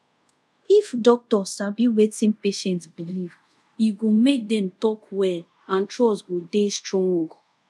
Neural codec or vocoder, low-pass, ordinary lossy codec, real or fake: codec, 24 kHz, 0.5 kbps, DualCodec; none; none; fake